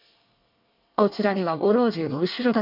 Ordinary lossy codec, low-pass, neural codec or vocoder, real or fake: none; 5.4 kHz; codec, 24 kHz, 1 kbps, SNAC; fake